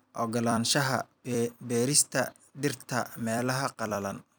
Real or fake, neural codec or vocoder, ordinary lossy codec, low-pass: fake; vocoder, 44.1 kHz, 128 mel bands every 256 samples, BigVGAN v2; none; none